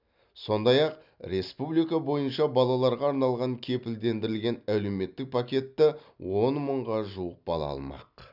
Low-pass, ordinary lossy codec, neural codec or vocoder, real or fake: 5.4 kHz; none; none; real